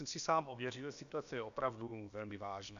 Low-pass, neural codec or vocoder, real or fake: 7.2 kHz; codec, 16 kHz, about 1 kbps, DyCAST, with the encoder's durations; fake